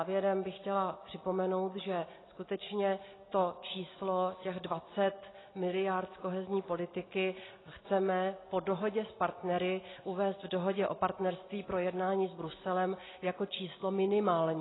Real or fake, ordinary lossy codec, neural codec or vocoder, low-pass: real; AAC, 16 kbps; none; 7.2 kHz